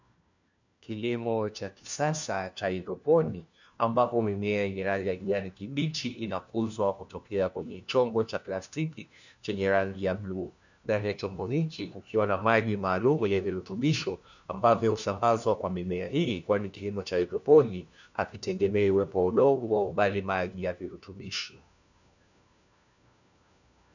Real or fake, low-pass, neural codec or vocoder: fake; 7.2 kHz; codec, 16 kHz, 1 kbps, FunCodec, trained on LibriTTS, 50 frames a second